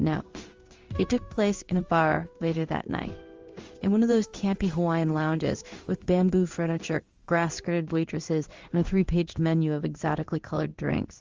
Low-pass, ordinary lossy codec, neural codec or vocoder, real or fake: 7.2 kHz; Opus, 32 kbps; codec, 16 kHz in and 24 kHz out, 1 kbps, XY-Tokenizer; fake